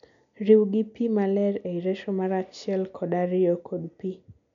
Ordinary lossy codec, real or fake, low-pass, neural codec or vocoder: none; real; 7.2 kHz; none